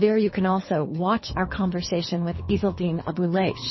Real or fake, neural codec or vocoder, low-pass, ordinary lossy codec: fake; codec, 24 kHz, 3 kbps, HILCodec; 7.2 kHz; MP3, 24 kbps